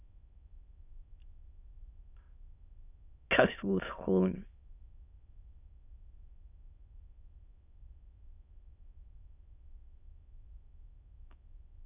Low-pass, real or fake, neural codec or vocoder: 3.6 kHz; fake; autoencoder, 22.05 kHz, a latent of 192 numbers a frame, VITS, trained on many speakers